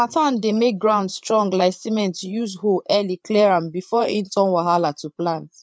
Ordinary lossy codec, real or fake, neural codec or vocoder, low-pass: none; fake; codec, 16 kHz, 8 kbps, FreqCodec, larger model; none